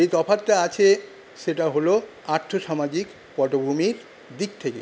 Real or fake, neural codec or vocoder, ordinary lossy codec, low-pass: real; none; none; none